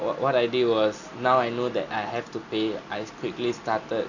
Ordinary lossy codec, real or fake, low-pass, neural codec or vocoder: none; real; 7.2 kHz; none